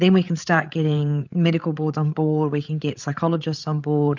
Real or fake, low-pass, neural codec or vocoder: fake; 7.2 kHz; codec, 16 kHz, 8 kbps, FreqCodec, larger model